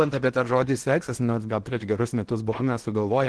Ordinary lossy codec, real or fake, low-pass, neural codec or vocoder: Opus, 16 kbps; fake; 10.8 kHz; codec, 16 kHz in and 24 kHz out, 0.8 kbps, FocalCodec, streaming, 65536 codes